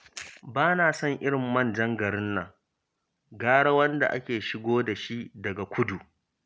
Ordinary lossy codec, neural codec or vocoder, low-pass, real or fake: none; none; none; real